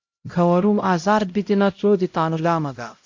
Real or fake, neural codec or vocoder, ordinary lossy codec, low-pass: fake; codec, 16 kHz, 0.5 kbps, X-Codec, HuBERT features, trained on LibriSpeech; MP3, 48 kbps; 7.2 kHz